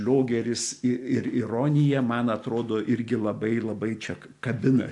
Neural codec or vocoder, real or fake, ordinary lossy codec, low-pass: none; real; AAC, 64 kbps; 10.8 kHz